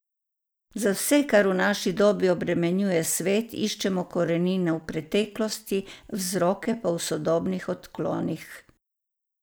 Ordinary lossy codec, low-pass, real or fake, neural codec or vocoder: none; none; real; none